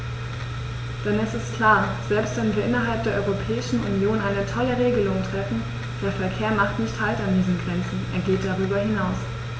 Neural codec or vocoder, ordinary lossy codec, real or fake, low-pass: none; none; real; none